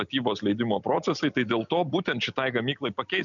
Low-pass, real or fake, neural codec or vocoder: 7.2 kHz; real; none